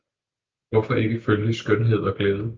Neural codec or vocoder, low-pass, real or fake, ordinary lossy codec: none; 7.2 kHz; real; Opus, 24 kbps